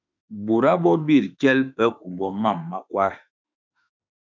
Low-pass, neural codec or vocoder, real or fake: 7.2 kHz; autoencoder, 48 kHz, 32 numbers a frame, DAC-VAE, trained on Japanese speech; fake